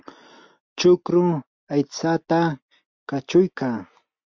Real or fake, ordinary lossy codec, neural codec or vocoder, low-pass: real; MP3, 64 kbps; none; 7.2 kHz